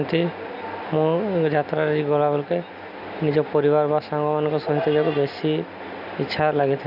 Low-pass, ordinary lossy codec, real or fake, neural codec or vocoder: 5.4 kHz; none; real; none